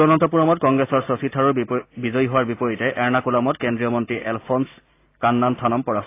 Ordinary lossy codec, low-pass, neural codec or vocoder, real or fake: AAC, 24 kbps; 3.6 kHz; none; real